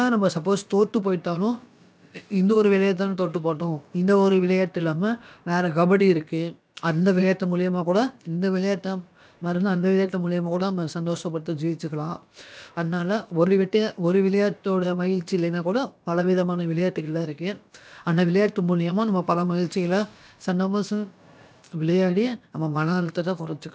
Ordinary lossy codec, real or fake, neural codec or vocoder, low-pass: none; fake; codec, 16 kHz, about 1 kbps, DyCAST, with the encoder's durations; none